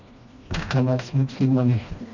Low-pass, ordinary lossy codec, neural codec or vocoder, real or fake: 7.2 kHz; none; codec, 16 kHz, 1 kbps, FreqCodec, smaller model; fake